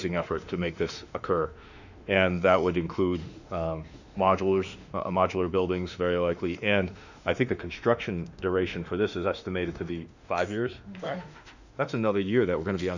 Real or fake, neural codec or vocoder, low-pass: fake; autoencoder, 48 kHz, 32 numbers a frame, DAC-VAE, trained on Japanese speech; 7.2 kHz